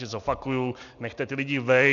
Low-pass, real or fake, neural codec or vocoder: 7.2 kHz; real; none